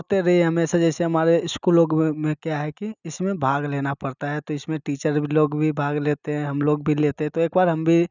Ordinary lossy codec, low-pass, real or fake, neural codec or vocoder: none; 7.2 kHz; real; none